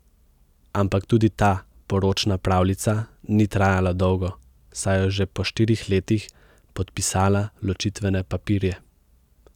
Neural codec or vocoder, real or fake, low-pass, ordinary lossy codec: none; real; 19.8 kHz; none